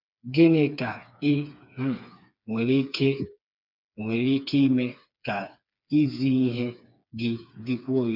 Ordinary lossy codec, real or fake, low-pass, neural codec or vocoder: none; fake; 5.4 kHz; codec, 16 kHz, 4 kbps, FreqCodec, smaller model